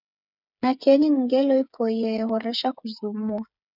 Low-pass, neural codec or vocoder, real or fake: 5.4 kHz; codec, 16 kHz, 8 kbps, FreqCodec, smaller model; fake